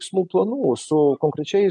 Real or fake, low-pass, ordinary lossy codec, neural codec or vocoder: real; 10.8 kHz; MP3, 64 kbps; none